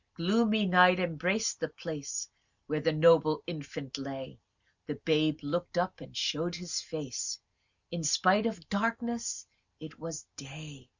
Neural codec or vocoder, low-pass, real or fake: none; 7.2 kHz; real